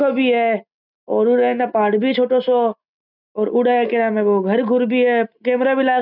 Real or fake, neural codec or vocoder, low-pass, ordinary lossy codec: real; none; 5.4 kHz; none